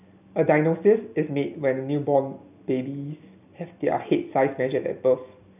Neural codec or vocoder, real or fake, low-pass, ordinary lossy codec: none; real; 3.6 kHz; none